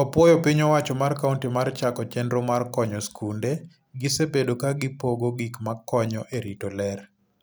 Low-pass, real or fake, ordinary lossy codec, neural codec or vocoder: none; real; none; none